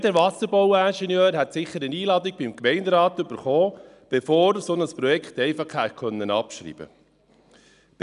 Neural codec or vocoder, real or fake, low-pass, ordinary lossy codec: none; real; 10.8 kHz; none